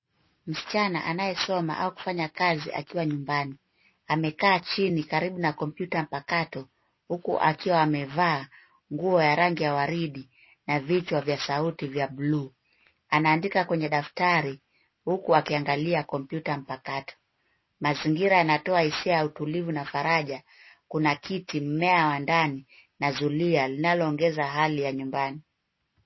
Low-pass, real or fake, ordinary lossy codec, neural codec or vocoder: 7.2 kHz; real; MP3, 24 kbps; none